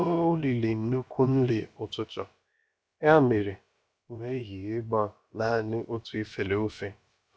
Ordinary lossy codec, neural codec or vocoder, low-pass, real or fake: none; codec, 16 kHz, about 1 kbps, DyCAST, with the encoder's durations; none; fake